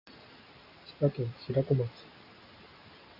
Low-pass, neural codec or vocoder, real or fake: 5.4 kHz; none; real